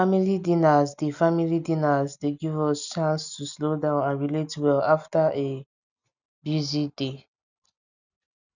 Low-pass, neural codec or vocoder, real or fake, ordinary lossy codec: 7.2 kHz; none; real; none